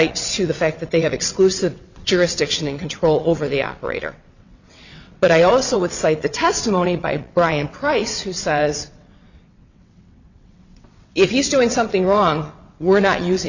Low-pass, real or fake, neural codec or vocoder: 7.2 kHz; fake; vocoder, 22.05 kHz, 80 mel bands, WaveNeXt